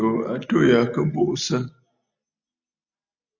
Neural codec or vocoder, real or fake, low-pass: none; real; 7.2 kHz